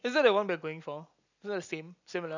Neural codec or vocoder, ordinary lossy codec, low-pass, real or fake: none; none; 7.2 kHz; real